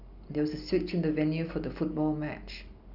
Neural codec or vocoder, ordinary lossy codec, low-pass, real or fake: none; none; 5.4 kHz; real